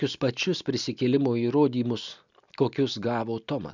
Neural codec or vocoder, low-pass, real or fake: none; 7.2 kHz; real